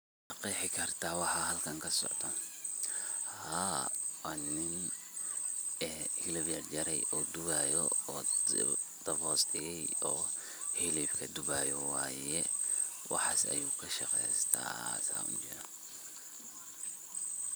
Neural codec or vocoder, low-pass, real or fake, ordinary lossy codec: none; none; real; none